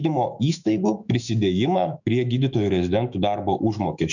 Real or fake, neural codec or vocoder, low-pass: fake; autoencoder, 48 kHz, 128 numbers a frame, DAC-VAE, trained on Japanese speech; 7.2 kHz